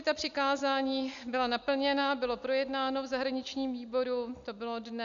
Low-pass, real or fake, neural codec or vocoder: 7.2 kHz; real; none